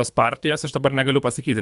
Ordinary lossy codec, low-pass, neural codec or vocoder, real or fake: MP3, 96 kbps; 10.8 kHz; codec, 24 kHz, 3 kbps, HILCodec; fake